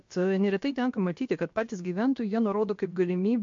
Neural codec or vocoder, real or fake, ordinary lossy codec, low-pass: codec, 16 kHz, about 1 kbps, DyCAST, with the encoder's durations; fake; MP3, 48 kbps; 7.2 kHz